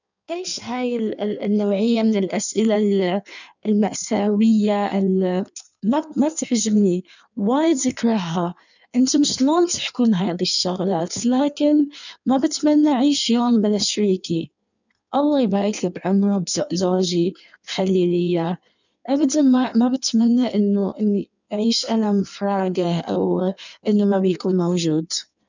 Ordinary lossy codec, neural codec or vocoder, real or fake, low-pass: none; codec, 16 kHz in and 24 kHz out, 1.1 kbps, FireRedTTS-2 codec; fake; 7.2 kHz